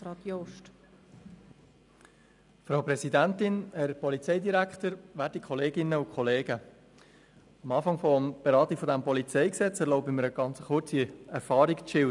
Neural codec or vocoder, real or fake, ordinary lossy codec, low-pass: none; real; none; 10.8 kHz